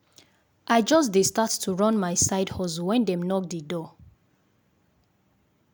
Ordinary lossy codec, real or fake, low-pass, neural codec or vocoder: none; real; none; none